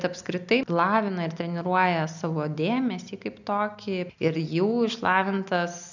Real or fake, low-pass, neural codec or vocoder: real; 7.2 kHz; none